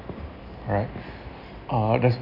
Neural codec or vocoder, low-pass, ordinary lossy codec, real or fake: none; 5.4 kHz; none; real